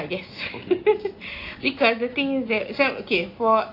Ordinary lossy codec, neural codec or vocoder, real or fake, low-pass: AAC, 32 kbps; none; real; 5.4 kHz